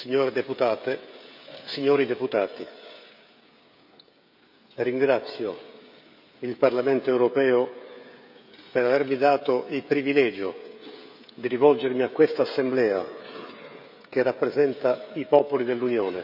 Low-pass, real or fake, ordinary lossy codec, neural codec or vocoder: 5.4 kHz; fake; none; codec, 16 kHz, 16 kbps, FreqCodec, smaller model